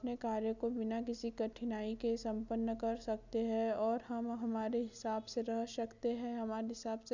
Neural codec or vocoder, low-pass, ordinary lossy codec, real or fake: none; 7.2 kHz; none; real